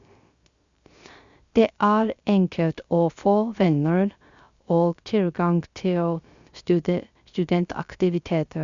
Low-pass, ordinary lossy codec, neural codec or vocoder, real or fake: 7.2 kHz; Opus, 64 kbps; codec, 16 kHz, 0.3 kbps, FocalCodec; fake